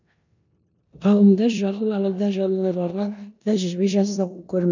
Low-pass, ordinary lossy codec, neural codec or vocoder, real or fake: 7.2 kHz; none; codec, 16 kHz in and 24 kHz out, 0.9 kbps, LongCat-Audio-Codec, four codebook decoder; fake